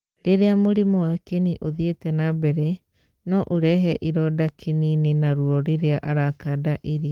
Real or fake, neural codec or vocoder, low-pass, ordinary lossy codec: fake; codec, 44.1 kHz, 7.8 kbps, Pupu-Codec; 19.8 kHz; Opus, 24 kbps